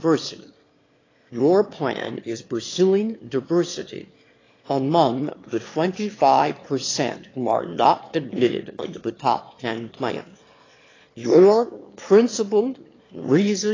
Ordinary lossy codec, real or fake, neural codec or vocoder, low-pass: AAC, 32 kbps; fake; autoencoder, 22.05 kHz, a latent of 192 numbers a frame, VITS, trained on one speaker; 7.2 kHz